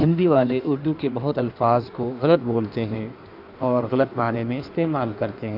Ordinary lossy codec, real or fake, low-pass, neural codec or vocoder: none; fake; 5.4 kHz; codec, 16 kHz in and 24 kHz out, 1.1 kbps, FireRedTTS-2 codec